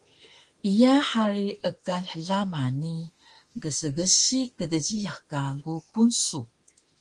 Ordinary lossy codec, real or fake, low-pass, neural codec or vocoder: Opus, 24 kbps; fake; 10.8 kHz; autoencoder, 48 kHz, 32 numbers a frame, DAC-VAE, trained on Japanese speech